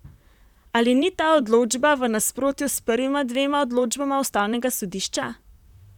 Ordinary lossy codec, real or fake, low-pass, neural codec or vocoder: none; fake; 19.8 kHz; vocoder, 44.1 kHz, 128 mel bands, Pupu-Vocoder